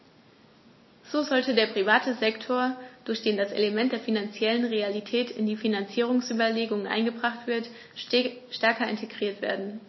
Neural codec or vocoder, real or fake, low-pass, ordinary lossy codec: none; real; 7.2 kHz; MP3, 24 kbps